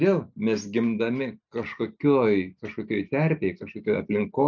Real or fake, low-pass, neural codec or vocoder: real; 7.2 kHz; none